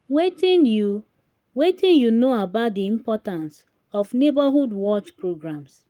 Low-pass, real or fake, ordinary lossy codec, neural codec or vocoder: 19.8 kHz; fake; Opus, 32 kbps; codec, 44.1 kHz, 7.8 kbps, Pupu-Codec